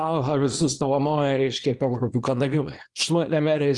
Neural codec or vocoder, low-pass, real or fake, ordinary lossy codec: codec, 24 kHz, 0.9 kbps, WavTokenizer, small release; 10.8 kHz; fake; Opus, 24 kbps